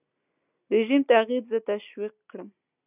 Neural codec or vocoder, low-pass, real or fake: none; 3.6 kHz; real